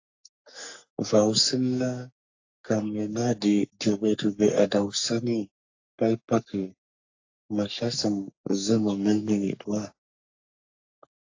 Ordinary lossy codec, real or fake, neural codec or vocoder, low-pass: AAC, 48 kbps; fake; codec, 44.1 kHz, 3.4 kbps, Pupu-Codec; 7.2 kHz